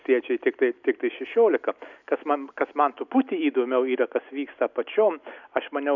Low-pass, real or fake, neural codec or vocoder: 7.2 kHz; real; none